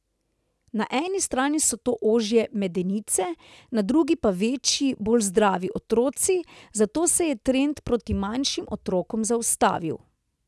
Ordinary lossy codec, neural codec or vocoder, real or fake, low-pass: none; none; real; none